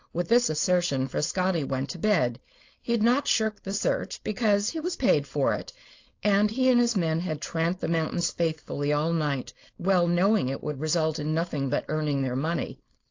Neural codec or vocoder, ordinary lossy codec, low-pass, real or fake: codec, 16 kHz, 4.8 kbps, FACodec; AAC, 48 kbps; 7.2 kHz; fake